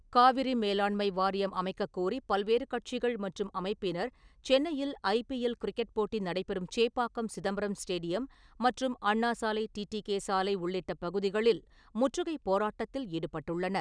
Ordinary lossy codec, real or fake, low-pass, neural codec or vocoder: none; real; 9.9 kHz; none